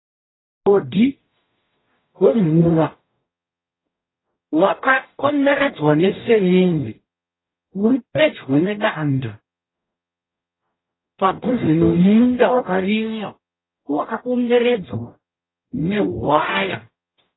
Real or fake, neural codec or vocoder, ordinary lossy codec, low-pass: fake; codec, 44.1 kHz, 0.9 kbps, DAC; AAC, 16 kbps; 7.2 kHz